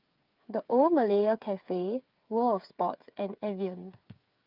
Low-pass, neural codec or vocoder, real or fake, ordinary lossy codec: 5.4 kHz; codec, 16 kHz, 8 kbps, FreqCodec, smaller model; fake; Opus, 24 kbps